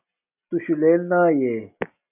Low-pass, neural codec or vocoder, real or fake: 3.6 kHz; none; real